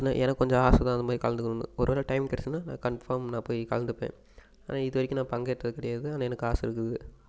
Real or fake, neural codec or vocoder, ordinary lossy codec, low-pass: real; none; none; none